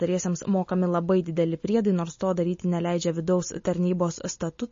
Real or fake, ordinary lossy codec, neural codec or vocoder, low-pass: real; MP3, 32 kbps; none; 7.2 kHz